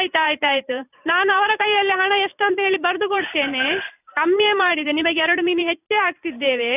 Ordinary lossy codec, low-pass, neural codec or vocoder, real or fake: none; 3.6 kHz; vocoder, 44.1 kHz, 128 mel bands every 512 samples, BigVGAN v2; fake